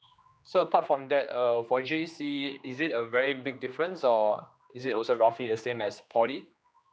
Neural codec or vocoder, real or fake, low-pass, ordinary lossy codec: codec, 16 kHz, 2 kbps, X-Codec, HuBERT features, trained on general audio; fake; none; none